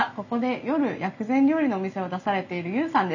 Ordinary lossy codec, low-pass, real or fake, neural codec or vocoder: none; 7.2 kHz; real; none